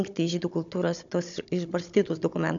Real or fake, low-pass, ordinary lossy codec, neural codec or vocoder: fake; 7.2 kHz; MP3, 64 kbps; codec, 16 kHz, 16 kbps, FunCodec, trained on Chinese and English, 50 frames a second